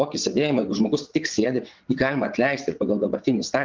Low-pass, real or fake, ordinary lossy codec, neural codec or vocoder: 7.2 kHz; fake; Opus, 24 kbps; vocoder, 22.05 kHz, 80 mel bands, WaveNeXt